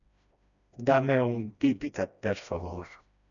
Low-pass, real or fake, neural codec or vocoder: 7.2 kHz; fake; codec, 16 kHz, 1 kbps, FreqCodec, smaller model